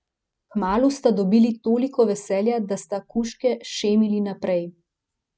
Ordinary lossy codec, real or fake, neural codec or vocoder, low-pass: none; real; none; none